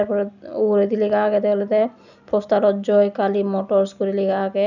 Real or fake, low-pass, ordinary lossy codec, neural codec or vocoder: real; 7.2 kHz; none; none